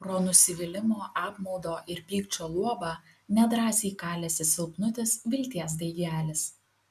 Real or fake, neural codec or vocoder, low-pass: real; none; 14.4 kHz